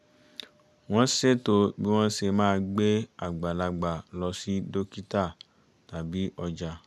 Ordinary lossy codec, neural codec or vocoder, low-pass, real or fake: none; none; none; real